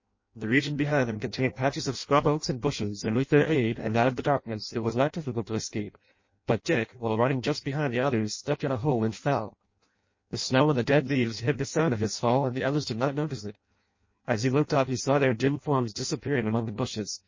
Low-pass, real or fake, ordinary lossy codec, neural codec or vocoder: 7.2 kHz; fake; MP3, 32 kbps; codec, 16 kHz in and 24 kHz out, 0.6 kbps, FireRedTTS-2 codec